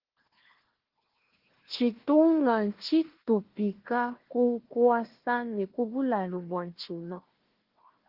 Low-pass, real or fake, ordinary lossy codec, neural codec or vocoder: 5.4 kHz; fake; Opus, 16 kbps; codec, 16 kHz, 1 kbps, FunCodec, trained on Chinese and English, 50 frames a second